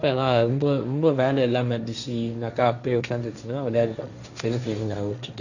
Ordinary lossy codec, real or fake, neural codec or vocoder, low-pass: none; fake; codec, 16 kHz, 1.1 kbps, Voila-Tokenizer; 7.2 kHz